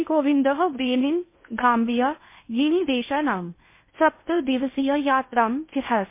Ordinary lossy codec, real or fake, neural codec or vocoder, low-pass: MP3, 24 kbps; fake; codec, 16 kHz in and 24 kHz out, 0.6 kbps, FocalCodec, streaming, 2048 codes; 3.6 kHz